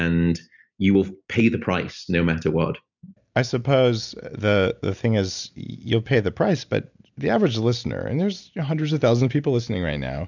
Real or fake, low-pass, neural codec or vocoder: real; 7.2 kHz; none